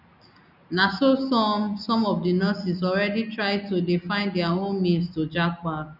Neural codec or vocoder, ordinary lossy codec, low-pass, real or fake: none; none; 5.4 kHz; real